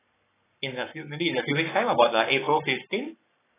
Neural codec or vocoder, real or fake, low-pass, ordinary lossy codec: codec, 44.1 kHz, 7.8 kbps, Pupu-Codec; fake; 3.6 kHz; AAC, 16 kbps